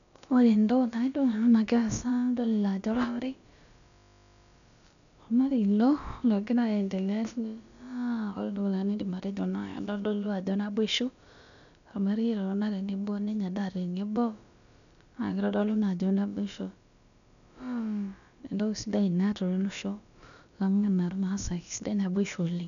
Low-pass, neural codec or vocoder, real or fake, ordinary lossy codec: 7.2 kHz; codec, 16 kHz, about 1 kbps, DyCAST, with the encoder's durations; fake; none